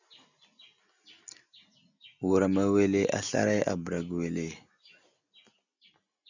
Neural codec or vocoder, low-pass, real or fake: none; 7.2 kHz; real